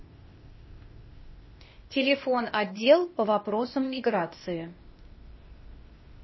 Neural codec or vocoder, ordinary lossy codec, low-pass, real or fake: codec, 16 kHz, 0.8 kbps, ZipCodec; MP3, 24 kbps; 7.2 kHz; fake